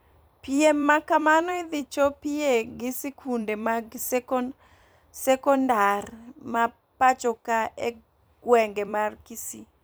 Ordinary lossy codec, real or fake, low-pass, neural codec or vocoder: none; fake; none; vocoder, 44.1 kHz, 128 mel bands every 256 samples, BigVGAN v2